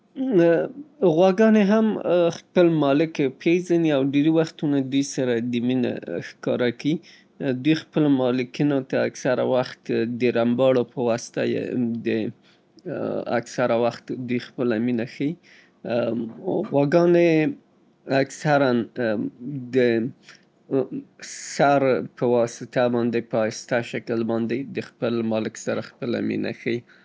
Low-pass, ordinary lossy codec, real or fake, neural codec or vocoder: none; none; real; none